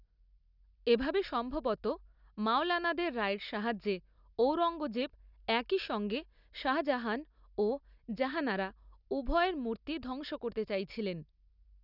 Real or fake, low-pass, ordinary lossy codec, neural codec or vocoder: real; 5.4 kHz; none; none